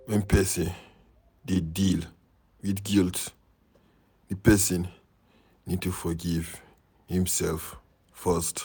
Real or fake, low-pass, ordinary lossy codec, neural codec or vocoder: real; none; none; none